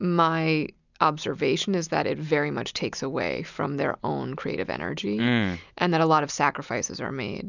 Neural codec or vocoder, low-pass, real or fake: none; 7.2 kHz; real